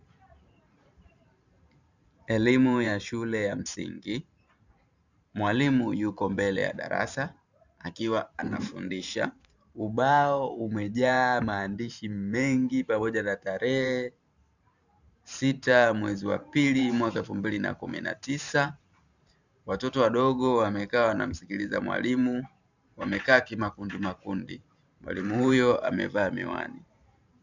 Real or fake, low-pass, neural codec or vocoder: fake; 7.2 kHz; vocoder, 44.1 kHz, 128 mel bands every 512 samples, BigVGAN v2